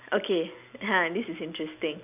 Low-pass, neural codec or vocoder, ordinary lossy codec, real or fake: 3.6 kHz; none; none; real